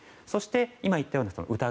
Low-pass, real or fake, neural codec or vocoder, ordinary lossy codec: none; real; none; none